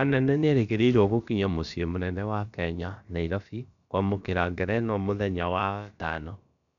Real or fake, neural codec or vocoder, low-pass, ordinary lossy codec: fake; codec, 16 kHz, about 1 kbps, DyCAST, with the encoder's durations; 7.2 kHz; none